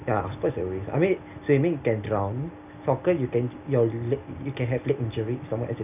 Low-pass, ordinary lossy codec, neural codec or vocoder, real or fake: 3.6 kHz; none; none; real